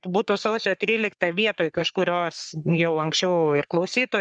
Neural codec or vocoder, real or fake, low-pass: codec, 44.1 kHz, 3.4 kbps, Pupu-Codec; fake; 9.9 kHz